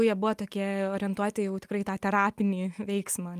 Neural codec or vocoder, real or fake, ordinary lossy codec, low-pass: none; real; Opus, 32 kbps; 14.4 kHz